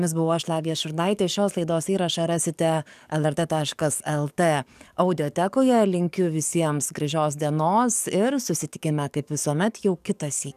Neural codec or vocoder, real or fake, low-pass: codec, 44.1 kHz, 7.8 kbps, DAC; fake; 14.4 kHz